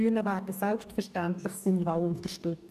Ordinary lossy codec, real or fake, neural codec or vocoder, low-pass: none; fake; codec, 44.1 kHz, 2.6 kbps, DAC; 14.4 kHz